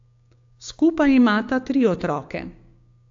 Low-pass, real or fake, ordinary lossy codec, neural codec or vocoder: 7.2 kHz; fake; AAC, 48 kbps; codec, 16 kHz, 6 kbps, DAC